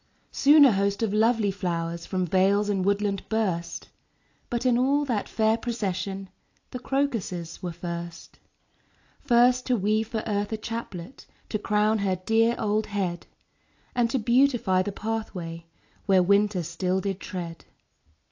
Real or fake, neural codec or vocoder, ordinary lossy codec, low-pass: real; none; AAC, 48 kbps; 7.2 kHz